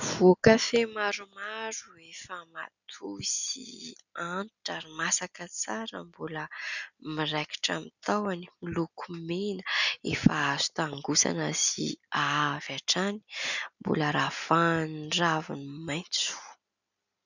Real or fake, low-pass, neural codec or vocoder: real; 7.2 kHz; none